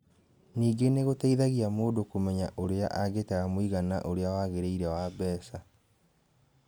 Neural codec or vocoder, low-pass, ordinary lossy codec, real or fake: none; none; none; real